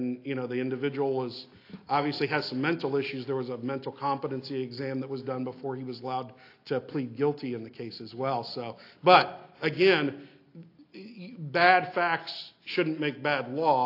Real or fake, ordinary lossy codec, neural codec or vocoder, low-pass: real; AAC, 32 kbps; none; 5.4 kHz